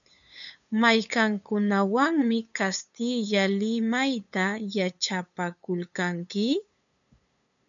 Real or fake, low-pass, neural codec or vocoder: fake; 7.2 kHz; codec, 16 kHz, 8 kbps, FunCodec, trained on LibriTTS, 25 frames a second